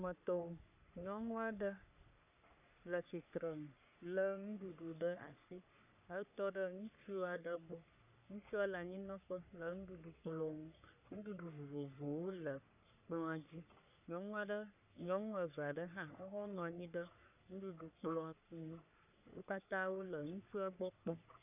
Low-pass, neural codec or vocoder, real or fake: 3.6 kHz; codec, 44.1 kHz, 3.4 kbps, Pupu-Codec; fake